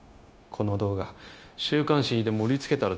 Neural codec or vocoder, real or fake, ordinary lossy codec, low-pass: codec, 16 kHz, 0.9 kbps, LongCat-Audio-Codec; fake; none; none